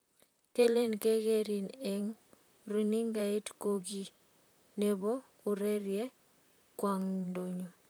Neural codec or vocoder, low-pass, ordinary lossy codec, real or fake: vocoder, 44.1 kHz, 128 mel bands, Pupu-Vocoder; none; none; fake